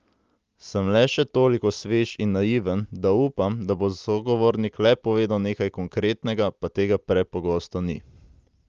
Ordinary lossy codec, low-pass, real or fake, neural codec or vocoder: Opus, 32 kbps; 7.2 kHz; real; none